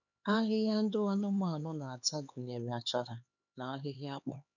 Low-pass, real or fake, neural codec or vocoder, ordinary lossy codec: 7.2 kHz; fake; codec, 16 kHz, 4 kbps, X-Codec, HuBERT features, trained on LibriSpeech; none